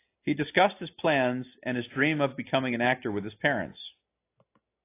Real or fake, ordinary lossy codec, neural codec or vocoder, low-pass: real; AAC, 24 kbps; none; 3.6 kHz